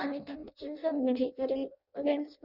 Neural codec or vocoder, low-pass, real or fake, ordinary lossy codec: codec, 16 kHz in and 24 kHz out, 0.6 kbps, FireRedTTS-2 codec; 5.4 kHz; fake; none